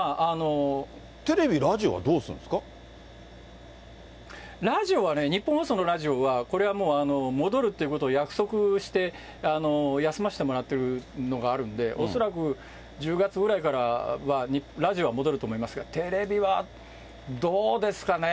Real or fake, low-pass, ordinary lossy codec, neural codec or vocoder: real; none; none; none